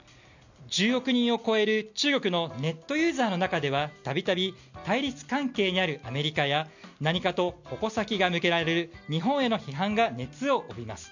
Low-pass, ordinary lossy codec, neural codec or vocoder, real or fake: 7.2 kHz; none; none; real